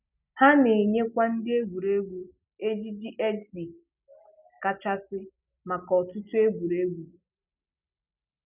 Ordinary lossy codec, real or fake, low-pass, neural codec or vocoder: none; real; 3.6 kHz; none